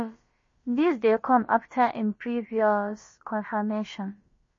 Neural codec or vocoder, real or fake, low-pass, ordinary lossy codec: codec, 16 kHz, about 1 kbps, DyCAST, with the encoder's durations; fake; 7.2 kHz; MP3, 32 kbps